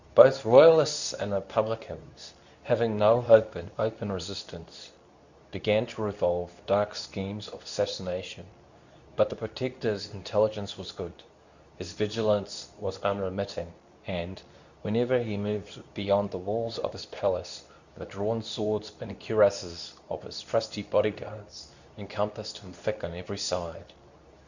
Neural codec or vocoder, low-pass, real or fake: codec, 24 kHz, 0.9 kbps, WavTokenizer, medium speech release version 2; 7.2 kHz; fake